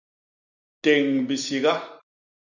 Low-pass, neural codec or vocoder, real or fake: 7.2 kHz; none; real